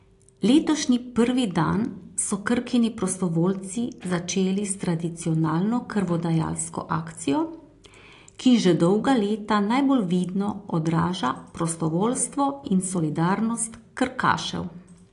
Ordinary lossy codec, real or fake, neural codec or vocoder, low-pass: AAC, 48 kbps; real; none; 10.8 kHz